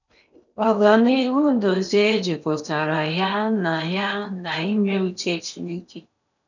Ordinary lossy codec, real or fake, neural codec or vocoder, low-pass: none; fake; codec, 16 kHz in and 24 kHz out, 0.8 kbps, FocalCodec, streaming, 65536 codes; 7.2 kHz